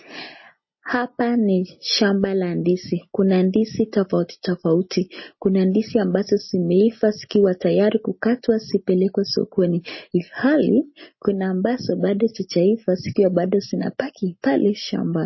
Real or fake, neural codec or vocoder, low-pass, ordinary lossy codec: real; none; 7.2 kHz; MP3, 24 kbps